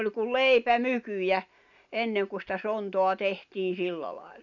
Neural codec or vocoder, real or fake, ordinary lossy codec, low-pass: none; real; none; 7.2 kHz